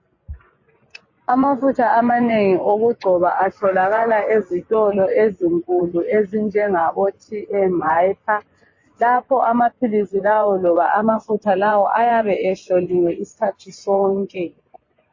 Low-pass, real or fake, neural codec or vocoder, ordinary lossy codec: 7.2 kHz; fake; vocoder, 24 kHz, 100 mel bands, Vocos; MP3, 32 kbps